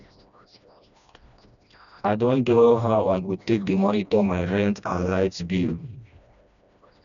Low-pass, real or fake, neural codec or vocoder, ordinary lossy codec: 7.2 kHz; fake; codec, 16 kHz, 1 kbps, FreqCodec, smaller model; none